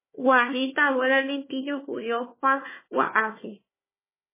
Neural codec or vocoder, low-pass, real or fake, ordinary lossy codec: codec, 16 kHz, 1 kbps, FunCodec, trained on Chinese and English, 50 frames a second; 3.6 kHz; fake; MP3, 16 kbps